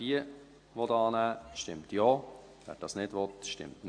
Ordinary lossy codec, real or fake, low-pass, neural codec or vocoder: AAC, 48 kbps; real; 9.9 kHz; none